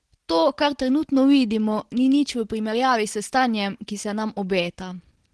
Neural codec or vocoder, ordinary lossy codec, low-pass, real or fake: none; Opus, 16 kbps; 10.8 kHz; real